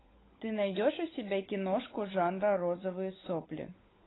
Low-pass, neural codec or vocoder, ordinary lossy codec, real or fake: 7.2 kHz; codec, 16 kHz, 16 kbps, FunCodec, trained on LibriTTS, 50 frames a second; AAC, 16 kbps; fake